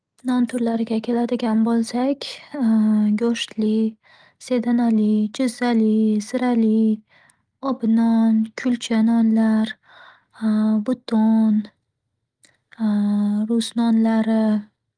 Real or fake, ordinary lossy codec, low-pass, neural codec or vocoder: real; Opus, 24 kbps; 9.9 kHz; none